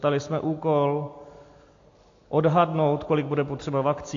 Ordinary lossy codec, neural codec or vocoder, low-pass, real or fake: AAC, 48 kbps; none; 7.2 kHz; real